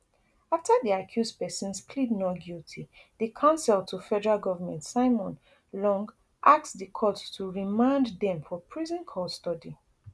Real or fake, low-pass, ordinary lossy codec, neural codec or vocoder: real; none; none; none